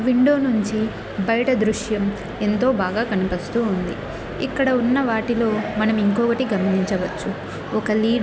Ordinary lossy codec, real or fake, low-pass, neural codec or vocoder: none; real; none; none